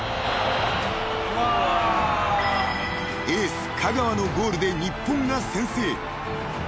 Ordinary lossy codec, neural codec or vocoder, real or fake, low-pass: none; none; real; none